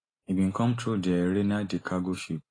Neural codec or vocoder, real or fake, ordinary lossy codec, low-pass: none; real; AAC, 32 kbps; 9.9 kHz